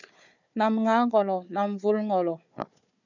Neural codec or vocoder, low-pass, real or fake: codec, 16 kHz, 4 kbps, FunCodec, trained on Chinese and English, 50 frames a second; 7.2 kHz; fake